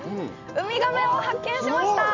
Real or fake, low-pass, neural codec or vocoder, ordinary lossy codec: real; 7.2 kHz; none; none